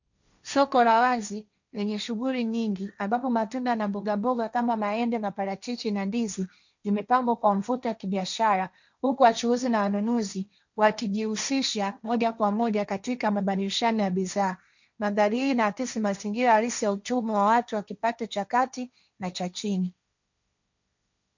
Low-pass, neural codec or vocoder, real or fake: 7.2 kHz; codec, 16 kHz, 1.1 kbps, Voila-Tokenizer; fake